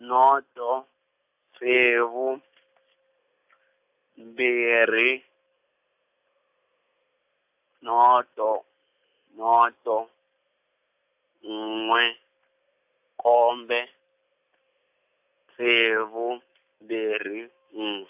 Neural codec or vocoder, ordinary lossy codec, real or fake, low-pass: none; AAC, 32 kbps; real; 3.6 kHz